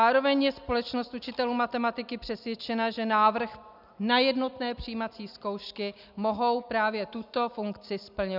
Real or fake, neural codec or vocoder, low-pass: real; none; 5.4 kHz